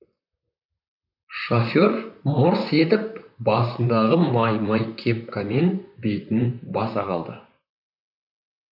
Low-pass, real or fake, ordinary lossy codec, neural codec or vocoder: 5.4 kHz; fake; none; vocoder, 44.1 kHz, 128 mel bands, Pupu-Vocoder